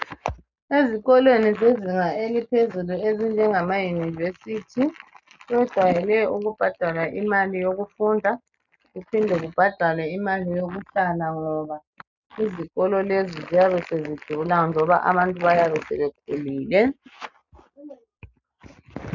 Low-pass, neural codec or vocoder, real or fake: 7.2 kHz; none; real